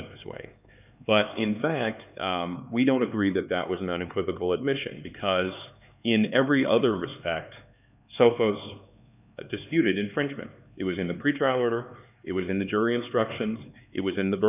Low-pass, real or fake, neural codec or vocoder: 3.6 kHz; fake; codec, 16 kHz, 4 kbps, X-Codec, HuBERT features, trained on LibriSpeech